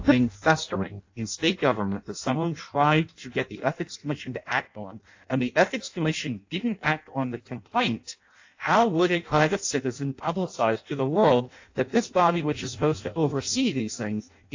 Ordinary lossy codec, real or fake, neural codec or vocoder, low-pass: AAC, 48 kbps; fake; codec, 16 kHz in and 24 kHz out, 0.6 kbps, FireRedTTS-2 codec; 7.2 kHz